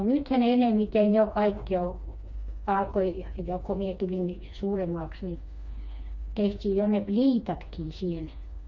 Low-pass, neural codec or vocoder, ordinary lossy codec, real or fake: 7.2 kHz; codec, 16 kHz, 2 kbps, FreqCodec, smaller model; MP3, 48 kbps; fake